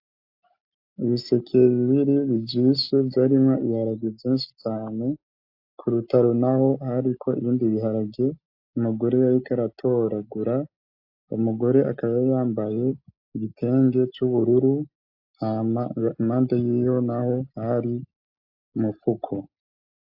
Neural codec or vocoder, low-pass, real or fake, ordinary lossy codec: none; 5.4 kHz; real; AAC, 48 kbps